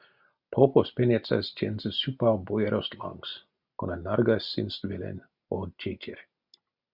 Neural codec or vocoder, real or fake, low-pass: none; real; 5.4 kHz